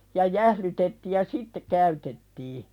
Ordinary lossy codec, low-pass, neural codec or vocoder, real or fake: none; 19.8 kHz; none; real